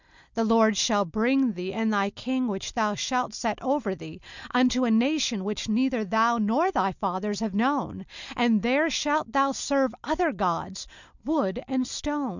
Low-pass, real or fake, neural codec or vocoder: 7.2 kHz; real; none